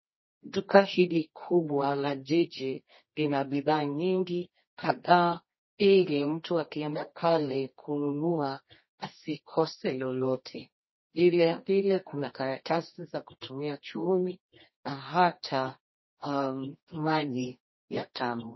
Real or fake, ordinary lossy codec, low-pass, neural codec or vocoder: fake; MP3, 24 kbps; 7.2 kHz; codec, 24 kHz, 0.9 kbps, WavTokenizer, medium music audio release